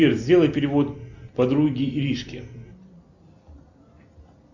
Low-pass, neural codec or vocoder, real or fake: 7.2 kHz; none; real